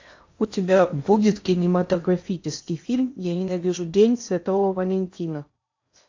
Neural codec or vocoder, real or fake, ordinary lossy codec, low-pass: codec, 16 kHz in and 24 kHz out, 0.8 kbps, FocalCodec, streaming, 65536 codes; fake; AAC, 48 kbps; 7.2 kHz